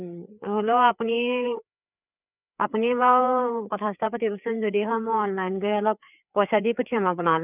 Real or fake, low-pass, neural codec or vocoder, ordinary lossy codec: fake; 3.6 kHz; codec, 16 kHz, 4 kbps, FreqCodec, larger model; none